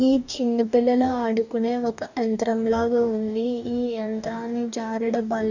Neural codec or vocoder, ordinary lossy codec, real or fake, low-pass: codec, 44.1 kHz, 2.6 kbps, DAC; none; fake; 7.2 kHz